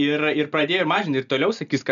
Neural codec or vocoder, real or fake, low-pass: none; real; 7.2 kHz